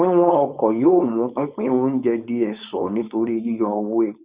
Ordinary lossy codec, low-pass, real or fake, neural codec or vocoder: Opus, 64 kbps; 3.6 kHz; fake; codec, 16 kHz, 4.8 kbps, FACodec